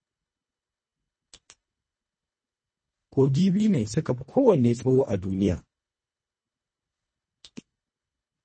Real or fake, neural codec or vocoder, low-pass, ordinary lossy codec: fake; codec, 24 kHz, 1.5 kbps, HILCodec; 9.9 kHz; MP3, 32 kbps